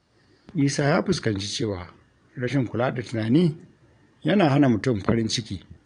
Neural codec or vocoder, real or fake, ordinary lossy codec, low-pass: vocoder, 22.05 kHz, 80 mel bands, Vocos; fake; none; 9.9 kHz